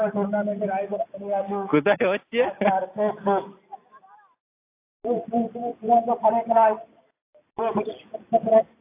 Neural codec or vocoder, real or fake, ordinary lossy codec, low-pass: none; real; AAC, 24 kbps; 3.6 kHz